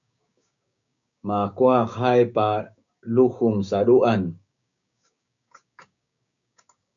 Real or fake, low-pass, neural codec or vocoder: fake; 7.2 kHz; codec, 16 kHz, 6 kbps, DAC